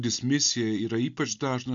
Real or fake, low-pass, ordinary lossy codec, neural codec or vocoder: real; 7.2 kHz; MP3, 64 kbps; none